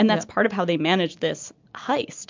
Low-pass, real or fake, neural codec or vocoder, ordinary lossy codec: 7.2 kHz; real; none; MP3, 64 kbps